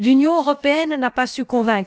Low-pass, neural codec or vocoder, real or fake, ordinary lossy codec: none; codec, 16 kHz, about 1 kbps, DyCAST, with the encoder's durations; fake; none